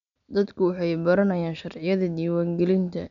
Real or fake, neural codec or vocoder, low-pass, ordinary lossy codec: real; none; 7.2 kHz; none